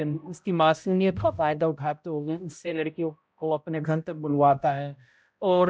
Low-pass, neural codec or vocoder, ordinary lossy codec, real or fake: none; codec, 16 kHz, 0.5 kbps, X-Codec, HuBERT features, trained on balanced general audio; none; fake